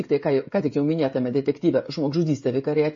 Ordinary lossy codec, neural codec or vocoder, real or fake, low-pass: MP3, 32 kbps; codec, 16 kHz, 16 kbps, FreqCodec, smaller model; fake; 7.2 kHz